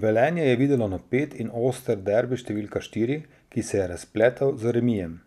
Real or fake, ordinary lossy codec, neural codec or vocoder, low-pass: real; none; none; 14.4 kHz